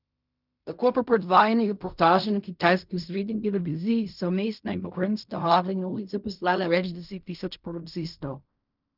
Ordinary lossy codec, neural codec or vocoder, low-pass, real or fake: none; codec, 16 kHz in and 24 kHz out, 0.4 kbps, LongCat-Audio-Codec, fine tuned four codebook decoder; 5.4 kHz; fake